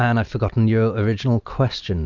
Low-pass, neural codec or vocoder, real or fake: 7.2 kHz; none; real